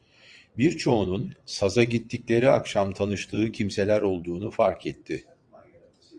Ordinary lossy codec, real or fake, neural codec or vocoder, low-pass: Opus, 64 kbps; fake; vocoder, 22.05 kHz, 80 mel bands, WaveNeXt; 9.9 kHz